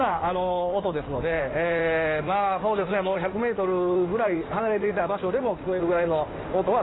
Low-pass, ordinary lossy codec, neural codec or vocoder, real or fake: 7.2 kHz; AAC, 16 kbps; codec, 16 kHz in and 24 kHz out, 2.2 kbps, FireRedTTS-2 codec; fake